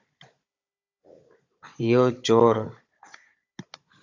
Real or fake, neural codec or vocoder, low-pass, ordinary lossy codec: fake; codec, 16 kHz, 16 kbps, FunCodec, trained on Chinese and English, 50 frames a second; 7.2 kHz; AAC, 48 kbps